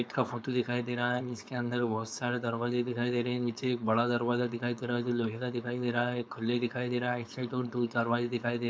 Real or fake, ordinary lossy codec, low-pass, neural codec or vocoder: fake; none; none; codec, 16 kHz, 4.8 kbps, FACodec